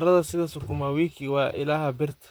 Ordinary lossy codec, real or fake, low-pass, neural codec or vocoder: none; fake; none; vocoder, 44.1 kHz, 128 mel bands, Pupu-Vocoder